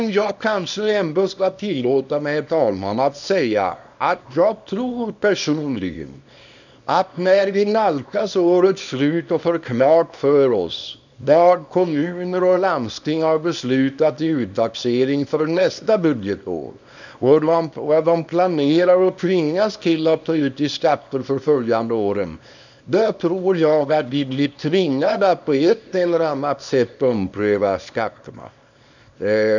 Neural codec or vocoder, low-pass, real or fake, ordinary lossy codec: codec, 24 kHz, 0.9 kbps, WavTokenizer, small release; 7.2 kHz; fake; none